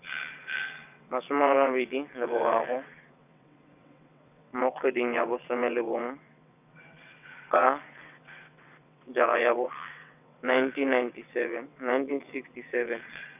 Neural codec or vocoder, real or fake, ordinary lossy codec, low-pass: vocoder, 22.05 kHz, 80 mel bands, WaveNeXt; fake; none; 3.6 kHz